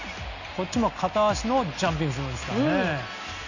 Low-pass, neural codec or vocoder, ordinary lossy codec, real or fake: 7.2 kHz; none; none; real